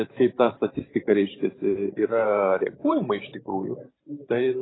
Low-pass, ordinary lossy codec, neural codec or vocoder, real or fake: 7.2 kHz; AAC, 16 kbps; vocoder, 44.1 kHz, 128 mel bands every 512 samples, BigVGAN v2; fake